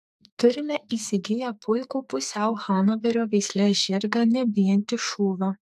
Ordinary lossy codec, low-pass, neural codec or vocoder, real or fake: AAC, 96 kbps; 14.4 kHz; codec, 44.1 kHz, 2.6 kbps, SNAC; fake